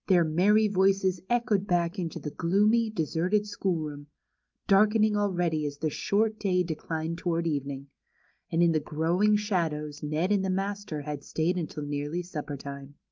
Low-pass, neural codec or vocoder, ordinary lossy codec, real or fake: 7.2 kHz; none; Opus, 24 kbps; real